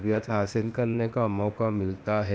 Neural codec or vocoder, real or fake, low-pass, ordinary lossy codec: codec, 16 kHz, 0.8 kbps, ZipCodec; fake; none; none